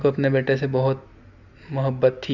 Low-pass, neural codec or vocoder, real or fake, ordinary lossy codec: 7.2 kHz; none; real; none